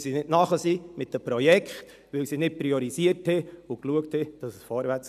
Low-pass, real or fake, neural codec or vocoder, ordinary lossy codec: 14.4 kHz; real; none; none